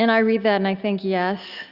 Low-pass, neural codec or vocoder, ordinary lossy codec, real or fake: 5.4 kHz; none; Opus, 64 kbps; real